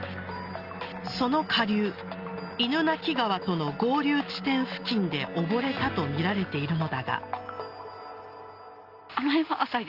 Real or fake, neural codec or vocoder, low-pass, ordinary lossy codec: real; none; 5.4 kHz; Opus, 24 kbps